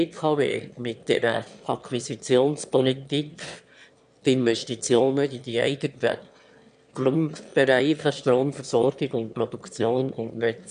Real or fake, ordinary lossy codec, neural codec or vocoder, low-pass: fake; AAC, 96 kbps; autoencoder, 22.05 kHz, a latent of 192 numbers a frame, VITS, trained on one speaker; 9.9 kHz